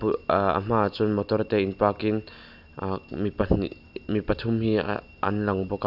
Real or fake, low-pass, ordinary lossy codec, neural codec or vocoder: real; 5.4 kHz; none; none